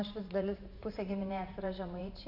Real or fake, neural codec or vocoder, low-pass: fake; vocoder, 44.1 kHz, 128 mel bands every 512 samples, BigVGAN v2; 5.4 kHz